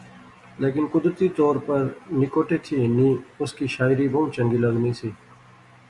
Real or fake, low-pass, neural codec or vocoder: real; 10.8 kHz; none